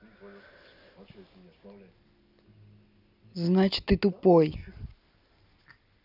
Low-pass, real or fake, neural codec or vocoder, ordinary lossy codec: 5.4 kHz; real; none; none